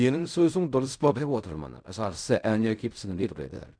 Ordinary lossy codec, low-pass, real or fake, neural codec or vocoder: none; 9.9 kHz; fake; codec, 16 kHz in and 24 kHz out, 0.4 kbps, LongCat-Audio-Codec, fine tuned four codebook decoder